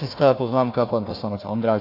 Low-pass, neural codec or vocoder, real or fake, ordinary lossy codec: 5.4 kHz; codec, 16 kHz, 1 kbps, FunCodec, trained on Chinese and English, 50 frames a second; fake; AAC, 32 kbps